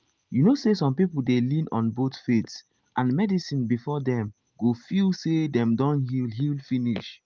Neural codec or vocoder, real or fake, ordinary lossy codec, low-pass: none; real; Opus, 24 kbps; 7.2 kHz